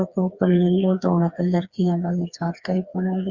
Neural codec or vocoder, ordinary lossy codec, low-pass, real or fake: codec, 16 kHz, 4 kbps, FreqCodec, smaller model; Opus, 64 kbps; 7.2 kHz; fake